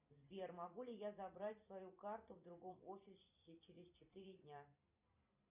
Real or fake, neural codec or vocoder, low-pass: fake; vocoder, 44.1 kHz, 128 mel bands every 256 samples, BigVGAN v2; 3.6 kHz